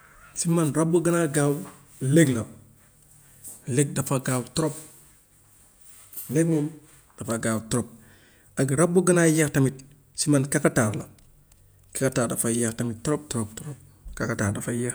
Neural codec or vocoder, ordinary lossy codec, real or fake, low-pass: vocoder, 48 kHz, 128 mel bands, Vocos; none; fake; none